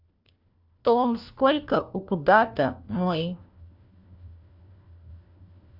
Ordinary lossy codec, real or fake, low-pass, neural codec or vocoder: AAC, 48 kbps; fake; 5.4 kHz; codec, 16 kHz, 1 kbps, FunCodec, trained on LibriTTS, 50 frames a second